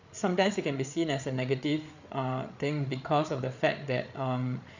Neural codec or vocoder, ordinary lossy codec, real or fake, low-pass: codec, 16 kHz, 8 kbps, FreqCodec, larger model; none; fake; 7.2 kHz